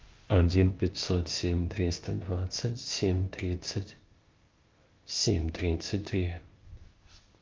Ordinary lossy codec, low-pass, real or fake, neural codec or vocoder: Opus, 24 kbps; 7.2 kHz; fake; codec, 16 kHz, 0.8 kbps, ZipCodec